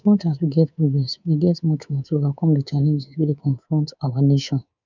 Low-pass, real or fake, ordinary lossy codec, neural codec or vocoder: 7.2 kHz; fake; none; codec, 24 kHz, 3.1 kbps, DualCodec